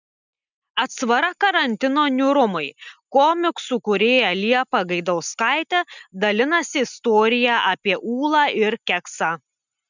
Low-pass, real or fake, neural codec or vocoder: 7.2 kHz; real; none